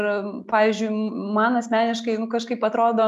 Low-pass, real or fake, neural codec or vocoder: 14.4 kHz; real; none